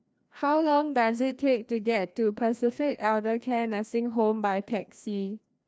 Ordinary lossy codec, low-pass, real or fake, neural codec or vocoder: none; none; fake; codec, 16 kHz, 1 kbps, FreqCodec, larger model